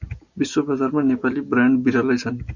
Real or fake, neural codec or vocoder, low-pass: real; none; 7.2 kHz